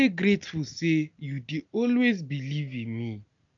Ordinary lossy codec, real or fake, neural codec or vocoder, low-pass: none; real; none; 7.2 kHz